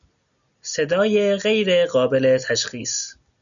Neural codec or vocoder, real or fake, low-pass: none; real; 7.2 kHz